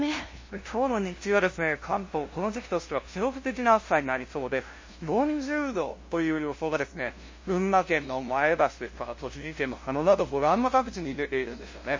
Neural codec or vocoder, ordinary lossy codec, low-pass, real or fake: codec, 16 kHz, 0.5 kbps, FunCodec, trained on LibriTTS, 25 frames a second; MP3, 32 kbps; 7.2 kHz; fake